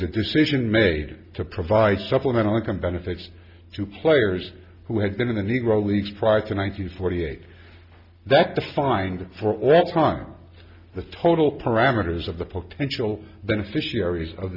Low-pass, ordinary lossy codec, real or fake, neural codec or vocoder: 5.4 kHz; Opus, 64 kbps; real; none